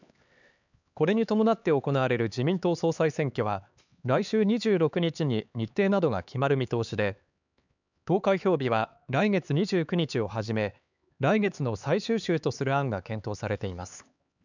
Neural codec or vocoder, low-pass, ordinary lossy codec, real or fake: codec, 16 kHz, 4 kbps, X-Codec, HuBERT features, trained on LibriSpeech; 7.2 kHz; none; fake